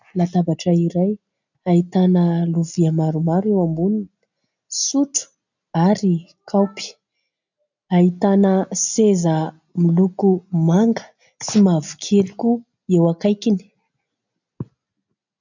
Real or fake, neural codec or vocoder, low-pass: real; none; 7.2 kHz